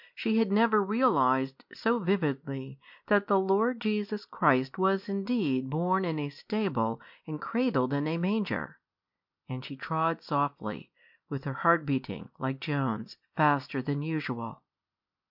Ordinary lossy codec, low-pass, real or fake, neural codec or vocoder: MP3, 48 kbps; 5.4 kHz; real; none